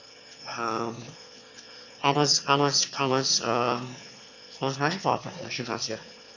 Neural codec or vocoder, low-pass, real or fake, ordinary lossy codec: autoencoder, 22.05 kHz, a latent of 192 numbers a frame, VITS, trained on one speaker; 7.2 kHz; fake; none